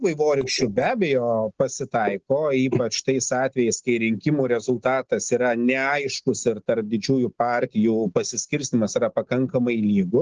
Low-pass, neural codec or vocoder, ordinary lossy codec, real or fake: 7.2 kHz; none; Opus, 16 kbps; real